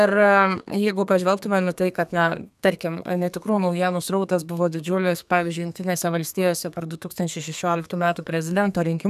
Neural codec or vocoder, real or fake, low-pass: codec, 32 kHz, 1.9 kbps, SNAC; fake; 14.4 kHz